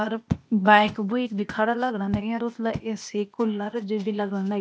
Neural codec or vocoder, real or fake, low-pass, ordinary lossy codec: codec, 16 kHz, 0.8 kbps, ZipCodec; fake; none; none